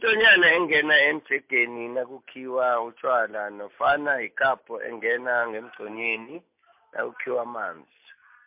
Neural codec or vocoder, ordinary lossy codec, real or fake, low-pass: none; MP3, 32 kbps; real; 3.6 kHz